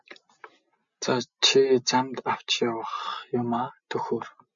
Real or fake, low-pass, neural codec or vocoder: real; 7.2 kHz; none